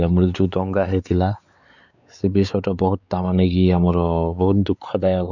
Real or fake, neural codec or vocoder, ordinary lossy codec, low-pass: fake; codec, 16 kHz, 4 kbps, X-Codec, WavLM features, trained on Multilingual LibriSpeech; none; 7.2 kHz